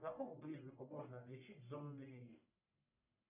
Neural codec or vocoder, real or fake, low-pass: codec, 44.1 kHz, 1.7 kbps, Pupu-Codec; fake; 3.6 kHz